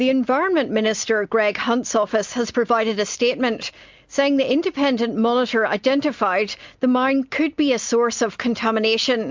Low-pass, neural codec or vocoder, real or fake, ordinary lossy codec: 7.2 kHz; none; real; MP3, 64 kbps